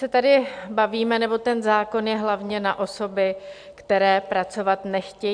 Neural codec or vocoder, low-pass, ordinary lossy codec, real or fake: none; 9.9 kHz; AAC, 64 kbps; real